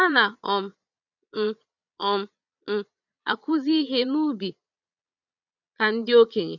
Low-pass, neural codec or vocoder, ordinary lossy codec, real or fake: 7.2 kHz; vocoder, 22.05 kHz, 80 mel bands, Vocos; none; fake